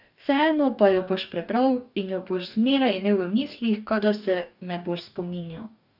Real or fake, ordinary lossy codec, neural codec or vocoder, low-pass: fake; none; codec, 44.1 kHz, 2.6 kbps, DAC; 5.4 kHz